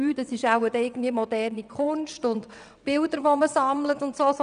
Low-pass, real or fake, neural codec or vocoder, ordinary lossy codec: 9.9 kHz; fake; vocoder, 22.05 kHz, 80 mel bands, WaveNeXt; none